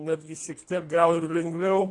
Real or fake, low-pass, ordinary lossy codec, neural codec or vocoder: fake; 10.8 kHz; AAC, 48 kbps; codec, 24 kHz, 1.5 kbps, HILCodec